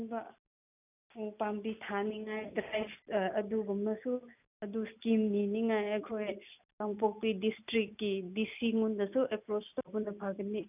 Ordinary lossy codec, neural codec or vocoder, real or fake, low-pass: none; none; real; 3.6 kHz